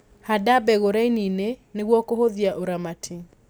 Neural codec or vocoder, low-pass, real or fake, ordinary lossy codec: none; none; real; none